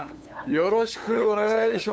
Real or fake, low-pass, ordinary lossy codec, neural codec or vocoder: fake; none; none; codec, 16 kHz, 8 kbps, FunCodec, trained on LibriTTS, 25 frames a second